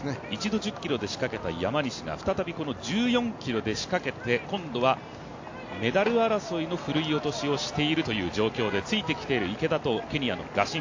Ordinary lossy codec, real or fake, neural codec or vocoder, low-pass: AAC, 48 kbps; real; none; 7.2 kHz